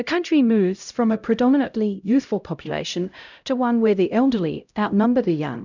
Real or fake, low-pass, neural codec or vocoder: fake; 7.2 kHz; codec, 16 kHz, 0.5 kbps, X-Codec, HuBERT features, trained on LibriSpeech